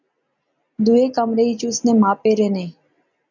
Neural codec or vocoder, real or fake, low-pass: none; real; 7.2 kHz